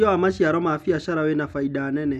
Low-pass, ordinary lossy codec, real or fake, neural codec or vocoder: 14.4 kHz; none; real; none